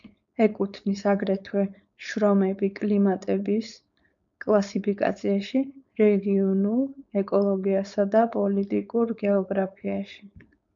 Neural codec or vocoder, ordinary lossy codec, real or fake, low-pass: codec, 16 kHz, 4.8 kbps, FACodec; MP3, 96 kbps; fake; 7.2 kHz